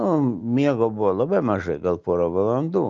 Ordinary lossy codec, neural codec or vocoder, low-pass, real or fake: Opus, 24 kbps; none; 7.2 kHz; real